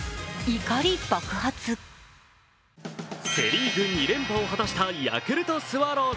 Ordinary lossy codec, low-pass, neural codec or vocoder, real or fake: none; none; none; real